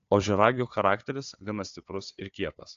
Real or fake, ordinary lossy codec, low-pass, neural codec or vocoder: fake; AAC, 48 kbps; 7.2 kHz; codec, 16 kHz, 4 kbps, FunCodec, trained on Chinese and English, 50 frames a second